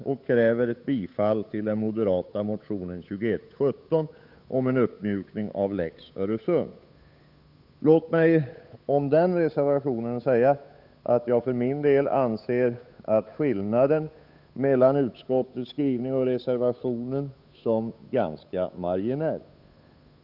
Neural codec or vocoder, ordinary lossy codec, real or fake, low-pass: codec, 16 kHz, 8 kbps, FunCodec, trained on Chinese and English, 25 frames a second; none; fake; 5.4 kHz